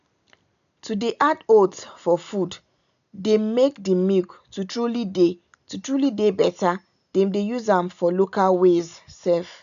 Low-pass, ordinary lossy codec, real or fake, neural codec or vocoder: 7.2 kHz; none; real; none